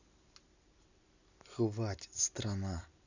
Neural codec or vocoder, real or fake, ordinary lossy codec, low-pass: none; real; none; 7.2 kHz